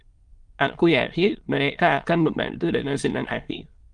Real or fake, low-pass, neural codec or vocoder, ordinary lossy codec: fake; 9.9 kHz; autoencoder, 22.05 kHz, a latent of 192 numbers a frame, VITS, trained on many speakers; Opus, 24 kbps